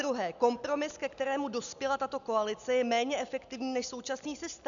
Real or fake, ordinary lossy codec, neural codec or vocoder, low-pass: real; MP3, 96 kbps; none; 7.2 kHz